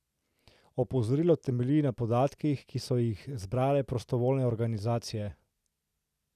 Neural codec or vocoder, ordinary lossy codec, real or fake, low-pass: none; none; real; 14.4 kHz